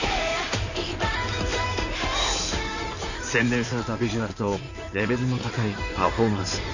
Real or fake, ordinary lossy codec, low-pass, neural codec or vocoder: fake; none; 7.2 kHz; codec, 16 kHz in and 24 kHz out, 2.2 kbps, FireRedTTS-2 codec